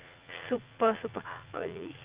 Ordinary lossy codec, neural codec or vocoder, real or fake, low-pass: Opus, 32 kbps; vocoder, 44.1 kHz, 80 mel bands, Vocos; fake; 3.6 kHz